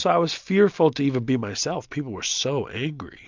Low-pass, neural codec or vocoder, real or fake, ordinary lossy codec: 7.2 kHz; none; real; MP3, 64 kbps